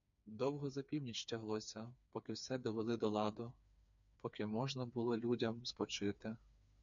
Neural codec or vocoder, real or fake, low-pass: codec, 16 kHz, 4 kbps, FreqCodec, smaller model; fake; 7.2 kHz